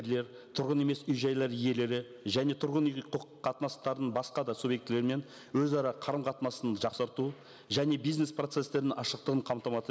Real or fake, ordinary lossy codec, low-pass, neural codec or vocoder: real; none; none; none